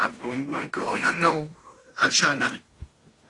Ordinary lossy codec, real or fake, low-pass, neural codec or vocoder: AAC, 32 kbps; fake; 10.8 kHz; codec, 16 kHz in and 24 kHz out, 0.9 kbps, LongCat-Audio-Codec, fine tuned four codebook decoder